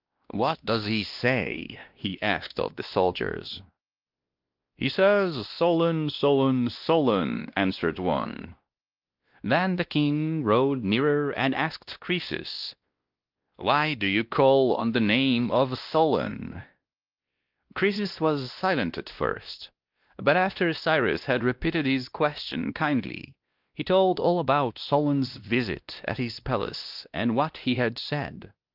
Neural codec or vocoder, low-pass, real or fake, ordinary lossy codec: codec, 16 kHz, 1 kbps, X-Codec, WavLM features, trained on Multilingual LibriSpeech; 5.4 kHz; fake; Opus, 24 kbps